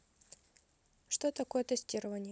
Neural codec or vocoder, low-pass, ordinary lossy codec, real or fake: none; none; none; real